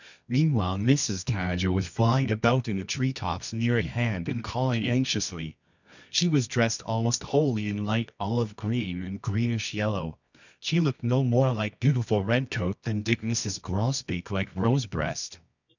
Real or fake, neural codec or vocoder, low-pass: fake; codec, 24 kHz, 0.9 kbps, WavTokenizer, medium music audio release; 7.2 kHz